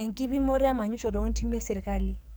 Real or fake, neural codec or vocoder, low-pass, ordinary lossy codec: fake; codec, 44.1 kHz, 2.6 kbps, SNAC; none; none